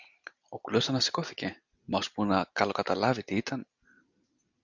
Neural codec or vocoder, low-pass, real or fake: none; 7.2 kHz; real